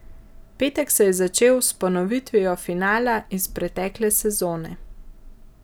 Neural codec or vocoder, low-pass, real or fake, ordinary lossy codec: none; none; real; none